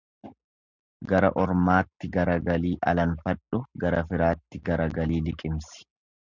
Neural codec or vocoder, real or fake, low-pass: none; real; 7.2 kHz